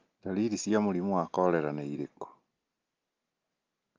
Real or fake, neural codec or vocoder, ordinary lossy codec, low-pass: real; none; Opus, 32 kbps; 7.2 kHz